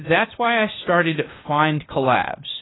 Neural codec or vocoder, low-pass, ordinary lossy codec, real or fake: codec, 16 kHz, 0.8 kbps, ZipCodec; 7.2 kHz; AAC, 16 kbps; fake